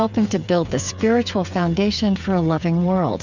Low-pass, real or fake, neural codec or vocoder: 7.2 kHz; fake; codec, 16 kHz, 8 kbps, FreqCodec, smaller model